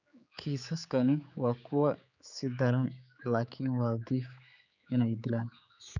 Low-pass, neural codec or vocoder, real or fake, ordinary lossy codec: 7.2 kHz; codec, 16 kHz, 4 kbps, X-Codec, HuBERT features, trained on general audio; fake; none